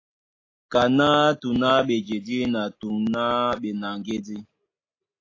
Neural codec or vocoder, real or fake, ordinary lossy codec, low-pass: none; real; AAC, 32 kbps; 7.2 kHz